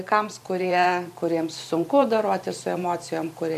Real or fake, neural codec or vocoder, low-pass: fake; vocoder, 44.1 kHz, 128 mel bands every 512 samples, BigVGAN v2; 14.4 kHz